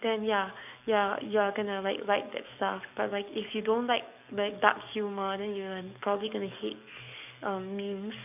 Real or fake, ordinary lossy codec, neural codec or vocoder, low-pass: fake; none; codec, 44.1 kHz, 7.8 kbps, DAC; 3.6 kHz